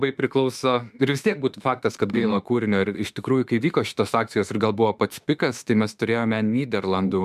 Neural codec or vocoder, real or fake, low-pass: autoencoder, 48 kHz, 32 numbers a frame, DAC-VAE, trained on Japanese speech; fake; 14.4 kHz